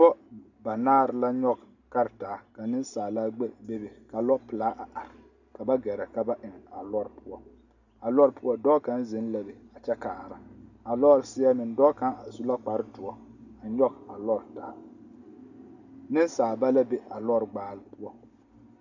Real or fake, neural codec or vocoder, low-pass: real; none; 7.2 kHz